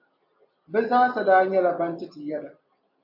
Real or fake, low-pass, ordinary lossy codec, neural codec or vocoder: real; 5.4 kHz; MP3, 48 kbps; none